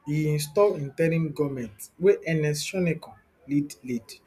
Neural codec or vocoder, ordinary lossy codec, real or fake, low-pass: none; none; real; 14.4 kHz